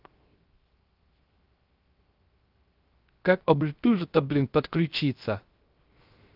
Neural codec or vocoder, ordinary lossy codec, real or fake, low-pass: codec, 16 kHz, 0.3 kbps, FocalCodec; Opus, 32 kbps; fake; 5.4 kHz